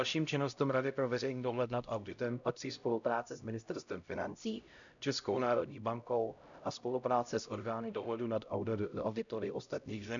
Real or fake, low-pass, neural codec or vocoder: fake; 7.2 kHz; codec, 16 kHz, 0.5 kbps, X-Codec, HuBERT features, trained on LibriSpeech